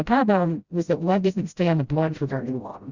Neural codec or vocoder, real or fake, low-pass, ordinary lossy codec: codec, 16 kHz, 0.5 kbps, FreqCodec, smaller model; fake; 7.2 kHz; Opus, 64 kbps